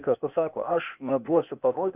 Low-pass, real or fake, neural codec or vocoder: 3.6 kHz; fake; codec, 16 kHz, 0.8 kbps, ZipCodec